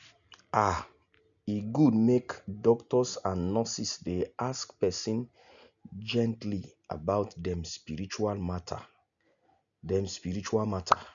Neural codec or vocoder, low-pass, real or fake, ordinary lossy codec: none; 7.2 kHz; real; none